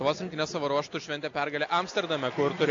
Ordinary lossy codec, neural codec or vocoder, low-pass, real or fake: AAC, 48 kbps; none; 7.2 kHz; real